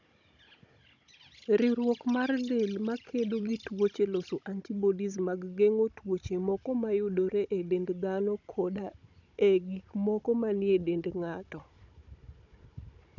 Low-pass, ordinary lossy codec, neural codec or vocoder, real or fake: 7.2 kHz; none; codec, 16 kHz, 16 kbps, FunCodec, trained on Chinese and English, 50 frames a second; fake